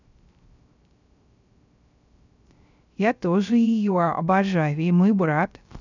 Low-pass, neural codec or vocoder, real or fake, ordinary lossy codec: 7.2 kHz; codec, 16 kHz, 0.3 kbps, FocalCodec; fake; none